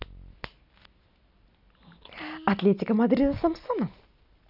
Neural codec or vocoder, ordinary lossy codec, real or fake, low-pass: none; none; real; 5.4 kHz